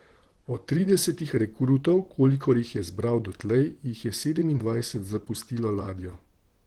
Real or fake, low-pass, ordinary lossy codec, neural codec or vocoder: fake; 19.8 kHz; Opus, 16 kbps; vocoder, 44.1 kHz, 128 mel bands, Pupu-Vocoder